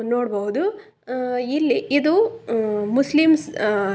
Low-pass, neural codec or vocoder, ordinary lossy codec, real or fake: none; none; none; real